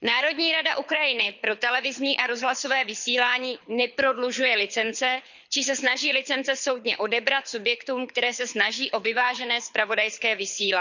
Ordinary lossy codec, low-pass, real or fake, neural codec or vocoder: none; 7.2 kHz; fake; codec, 24 kHz, 6 kbps, HILCodec